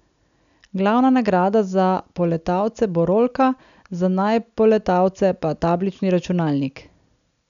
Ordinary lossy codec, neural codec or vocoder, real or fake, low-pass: none; none; real; 7.2 kHz